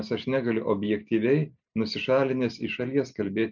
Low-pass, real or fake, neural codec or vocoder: 7.2 kHz; real; none